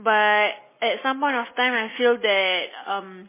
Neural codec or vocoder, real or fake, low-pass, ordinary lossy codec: none; real; 3.6 kHz; MP3, 16 kbps